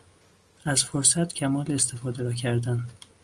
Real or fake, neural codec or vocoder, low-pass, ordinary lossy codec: real; none; 10.8 kHz; Opus, 32 kbps